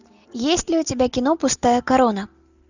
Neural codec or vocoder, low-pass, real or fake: none; 7.2 kHz; real